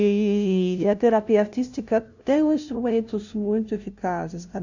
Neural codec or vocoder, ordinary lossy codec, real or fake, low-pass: codec, 16 kHz, 0.5 kbps, FunCodec, trained on LibriTTS, 25 frames a second; none; fake; 7.2 kHz